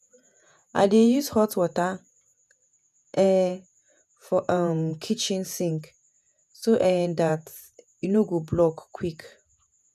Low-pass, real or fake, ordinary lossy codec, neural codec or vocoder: 14.4 kHz; fake; none; vocoder, 48 kHz, 128 mel bands, Vocos